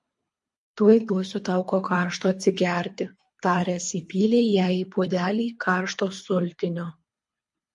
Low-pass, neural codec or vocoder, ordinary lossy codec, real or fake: 10.8 kHz; codec, 24 kHz, 3 kbps, HILCodec; MP3, 48 kbps; fake